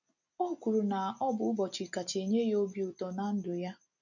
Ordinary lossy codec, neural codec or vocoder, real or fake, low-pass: none; none; real; 7.2 kHz